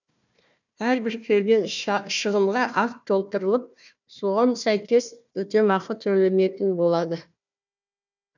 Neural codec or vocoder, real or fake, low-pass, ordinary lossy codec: codec, 16 kHz, 1 kbps, FunCodec, trained on Chinese and English, 50 frames a second; fake; 7.2 kHz; none